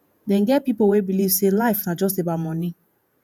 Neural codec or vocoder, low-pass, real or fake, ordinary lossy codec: vocoder, 48 kHz, 128 mel bands, Vocos; none; fake; none